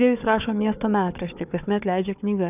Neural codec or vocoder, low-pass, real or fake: codec, 16 kHz, 4 kbps, FunCodec, trained on Chinese and English, 50 frames a second; 3.6 kHz; fake